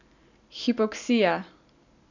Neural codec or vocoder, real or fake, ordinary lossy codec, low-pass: none; real; none; 7.2 kHz